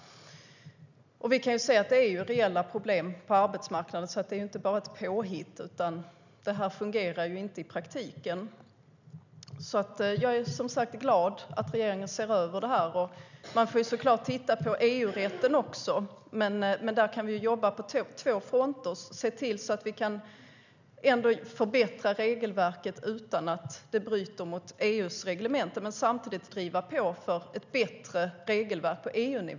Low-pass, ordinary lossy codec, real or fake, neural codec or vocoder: 7.2 kHz; none; real; none